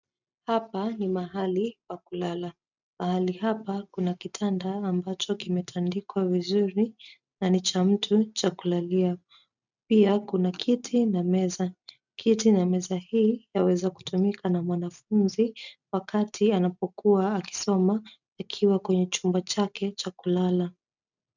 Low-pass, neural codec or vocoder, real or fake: 7.2 kHz; none; real